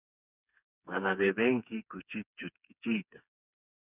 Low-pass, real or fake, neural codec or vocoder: 3.6 kHz; fake; codec, 16 kHz, 4 kbps, FreqCodec, smaller model